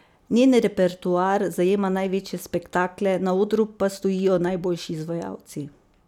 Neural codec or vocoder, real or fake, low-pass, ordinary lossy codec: none; real; 19.8 kHz; none